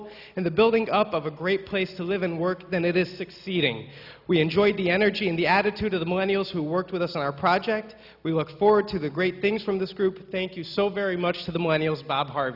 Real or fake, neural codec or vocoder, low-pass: real; none; 5.4 kHz